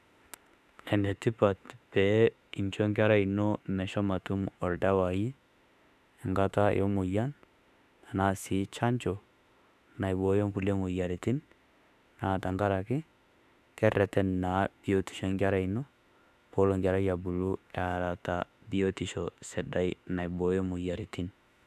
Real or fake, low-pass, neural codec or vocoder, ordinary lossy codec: fake; 14.4 kHz; autoencoder, 48 kHz, 32 numbers a frame, DAC-VAE, trained on Japanese speech; none